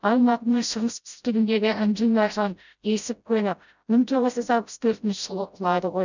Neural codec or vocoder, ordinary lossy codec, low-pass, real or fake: codec, 16 kHz, 0.5 kbps, FreqCodec, smaller model; none; 7.2 kHz; fake